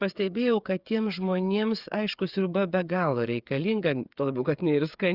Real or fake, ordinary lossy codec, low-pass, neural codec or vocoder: fake; Opus, 64 kbps; 5.4 kHz; codec, 16 kHz, 8 kbps, FreqCodec, smaller model